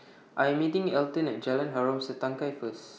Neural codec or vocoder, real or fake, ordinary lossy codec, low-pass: none; real; none; none